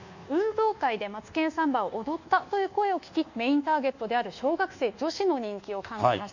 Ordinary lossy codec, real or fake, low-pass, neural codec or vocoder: none; fake; 7.2 kHz; codec, 24 kHz, 1.2 kbps, DualCodec